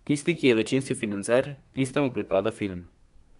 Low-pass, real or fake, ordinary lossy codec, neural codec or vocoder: 10.8 kHz; fake; none; codec, 24 kHz, 1 kbps, SNAC